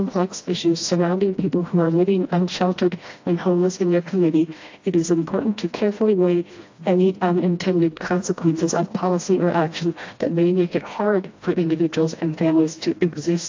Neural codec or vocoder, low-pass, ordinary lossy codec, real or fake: codec, 16 kHz, 1 kbps, FreqCodec, smaller model; 7.2 kHz; AAC, 48 kbps; fake